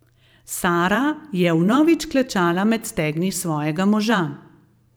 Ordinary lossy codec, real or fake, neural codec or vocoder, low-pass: none; fake; vocoder, 44.1 kHz, 128 mel bands every 512 samples, BigVGAN v2; none